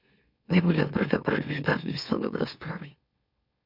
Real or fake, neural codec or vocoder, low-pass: fake; autoencoder, 44.1 kHz, a latent of 192 numbers a frame, MeloTTS; 5.4 kHz